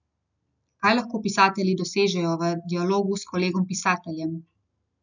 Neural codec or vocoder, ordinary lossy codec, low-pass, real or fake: none; none; 7.2 kHz; real